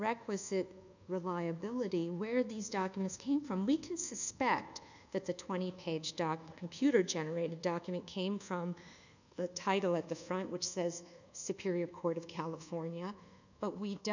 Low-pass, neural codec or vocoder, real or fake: 7.2 kHz; codec, 24 kHz, 1.2 kbps, DualCodec; fake